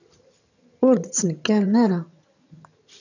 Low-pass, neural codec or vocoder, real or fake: 7.2 kHz; vocoder, 22.05 kHz, 80 mel bands, HiFi-GAN; fake